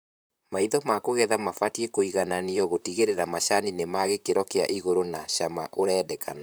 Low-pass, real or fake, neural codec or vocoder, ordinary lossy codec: none; fake; vocoder, 44.1 kHz, 128 mel bands, Pupu-Vocoder; none